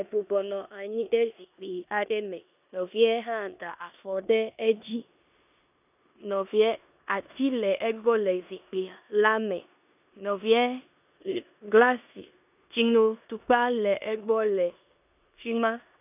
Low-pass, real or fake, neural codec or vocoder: 3.6 kHz; fake; codec, 16 kHz in and 24 kHz out, 0.9 kbps, LongCat-Audio-Codec, four codebook decoder